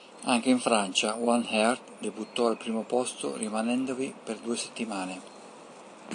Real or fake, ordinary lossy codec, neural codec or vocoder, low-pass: real; AAC, 64 kbps; none; 9.9 kHz